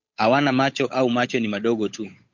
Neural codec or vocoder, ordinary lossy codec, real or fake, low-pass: codec, 16 kHz, 8 kbps, FunCodec, trained on Chinese and English, 25 frames a second; MP3, 48 kbps; fake; 7.2 kHz